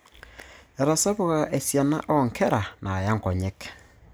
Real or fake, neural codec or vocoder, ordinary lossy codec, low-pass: real; none; none; none